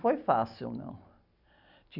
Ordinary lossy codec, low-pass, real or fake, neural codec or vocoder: none; 5.4 kHz; real; none